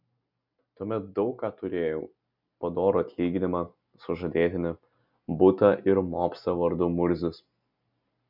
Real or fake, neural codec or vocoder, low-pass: real; none; 5.4 kHz